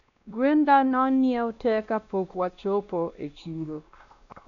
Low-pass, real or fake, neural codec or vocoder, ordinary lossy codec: 7.2 kHz; fake; codec, 16 kHz, 1 kbps, X-Codec, WavLM features, trained on Multilingual LibriSpeech; none